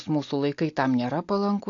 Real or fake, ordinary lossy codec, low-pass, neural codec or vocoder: real; AAC, 48 kbps; 7.2 kHz; none